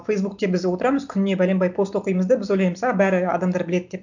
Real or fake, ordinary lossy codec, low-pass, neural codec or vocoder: real; none; 7.2 kHz; none